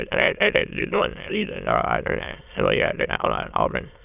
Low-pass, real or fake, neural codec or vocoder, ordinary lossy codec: 3.6 kHz; fake; autoencoder, 22.05 kHz, a latent of 192 numbers a frame, VITS, trained on many speakers; none